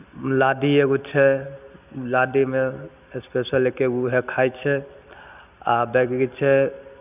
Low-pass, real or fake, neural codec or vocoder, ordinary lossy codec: 3.6 kHz; real; none; none